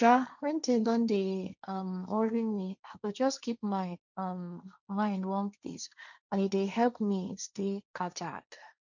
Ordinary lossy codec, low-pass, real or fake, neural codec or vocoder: none; 7.2 kHz; fake; codec, 16 kHz, 1.1 kbps, Voila-Tokenizer